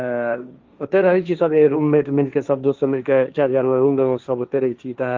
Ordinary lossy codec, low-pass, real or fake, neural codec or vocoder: Opus, 32 kbps; 7.2 kHz; fake; codec, 16 kHz, 1.1 kbps, Voila-Tokenizer